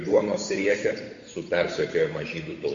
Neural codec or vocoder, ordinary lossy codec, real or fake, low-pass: codec, 16 kHz, 8 kbps, FunCodec, trained on Chinese and English, 25 frames a second; MP3, 48 kbps; fake; 7.2 kHz